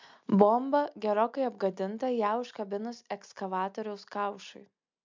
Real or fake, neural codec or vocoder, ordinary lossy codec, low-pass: real; none; MP3, 64 kbps; 7.2 kHz